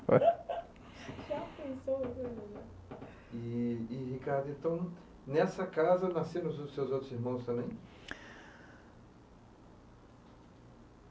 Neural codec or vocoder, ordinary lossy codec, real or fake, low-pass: none; none; real; none